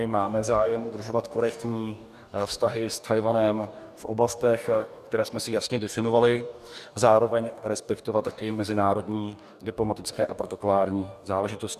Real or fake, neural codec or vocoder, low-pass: fake; codec, 44.1 kHz, 2.6 kbps, DAC; 14.4 kHz